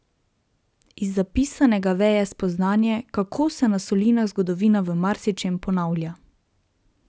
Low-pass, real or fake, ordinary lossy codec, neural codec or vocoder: none; real; none; none